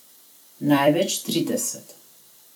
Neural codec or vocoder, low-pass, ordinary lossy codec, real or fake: vocoder, 44.1 kHz, 128 mel bands every 256 samples, BigVGAN v2; none; none; fake